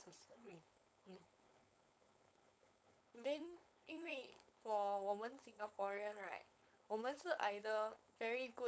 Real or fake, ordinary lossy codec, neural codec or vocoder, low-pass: fake; none; codec, 16 kHz, 4.8 kbps, FACodec; none